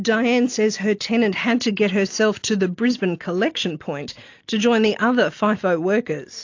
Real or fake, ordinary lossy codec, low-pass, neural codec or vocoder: real; AAC, 48 kbps; 7.2 kHz; none